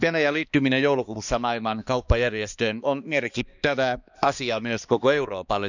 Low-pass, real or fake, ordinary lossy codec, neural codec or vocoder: 7.2 kHz; fake; none; codec, 16 kHz, 2 kbps, X-Codec, HuBERT features, trained on balanced general audio